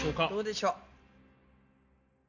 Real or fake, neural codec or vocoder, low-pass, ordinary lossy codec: real; none; 7.2 kHz; none